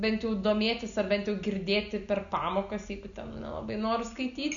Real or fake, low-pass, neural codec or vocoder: real; 7.2 kHz; none